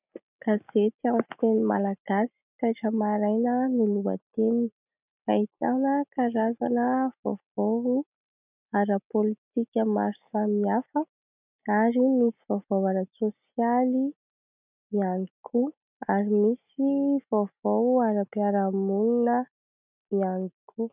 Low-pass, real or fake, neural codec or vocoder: 3.6 kHz; real; none